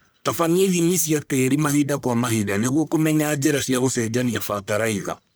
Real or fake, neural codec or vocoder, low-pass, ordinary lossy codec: fake; codec, 44.1 kHz, 1.7 kbps, Pupu-Codec; none; none